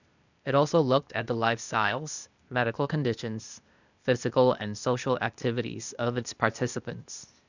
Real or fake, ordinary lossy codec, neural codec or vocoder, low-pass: fake; none; codec, 16 kHz, 0.8 kbps, ZipCodec; 7.2 kHz